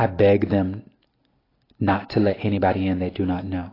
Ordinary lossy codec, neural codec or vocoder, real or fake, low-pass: AAC, 24 kbps; none; real; 5.4 kHz